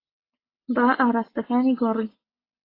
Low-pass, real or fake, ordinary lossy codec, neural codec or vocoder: 5.4 kHz; real; AAC, 24 kbps; none